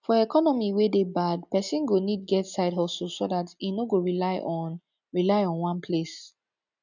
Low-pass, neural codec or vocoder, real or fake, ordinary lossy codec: 7.2 kHz; none; real; none